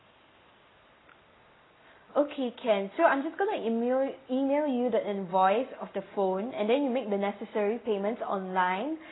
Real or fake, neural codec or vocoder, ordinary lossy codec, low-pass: real; none; AAC, 16 kbps; 7.2 kHz